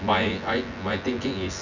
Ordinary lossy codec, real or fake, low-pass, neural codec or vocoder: none; fake; 7.2 kHz; vocoder, 24 kHz, 100 mel bands, Vocos